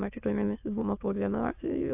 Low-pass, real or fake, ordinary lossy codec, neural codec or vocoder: 3.6 kHz; fake; AAC, 32 kbps; autoencoder, 22.05 kHz, a latent of 192 numbers a frame, VITS, trained on many speakers